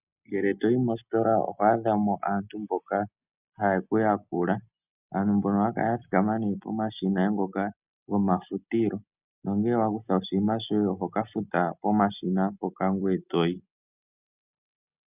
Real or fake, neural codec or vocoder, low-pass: real; none; 3.6 kHz